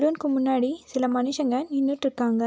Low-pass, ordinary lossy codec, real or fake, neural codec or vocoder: none; none; real; none